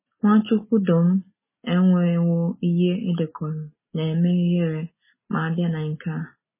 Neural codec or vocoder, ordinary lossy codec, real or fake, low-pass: none; MP3, 16 kbps; real; 3.6 kHz